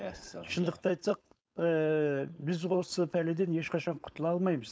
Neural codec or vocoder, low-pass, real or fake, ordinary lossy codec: codec, 16 kHz, 4.8 kbps, FACodec; none; fake; none